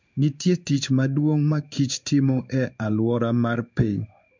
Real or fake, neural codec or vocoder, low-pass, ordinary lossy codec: fake; codec, 16 kHz in and 24 kHz out, 1 kbps, XY-Tokenizer; 7.2 kHz; MP3, 64 kbps